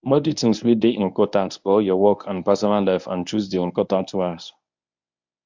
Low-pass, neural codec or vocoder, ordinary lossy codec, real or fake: 7.2 kHz; codec, 24 kHz, 0.9 kbps, WavTokenizer, medium speech release version 1; MP3, 64 kbps; fake